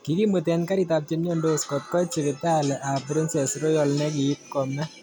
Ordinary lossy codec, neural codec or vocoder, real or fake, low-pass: none; none; real; none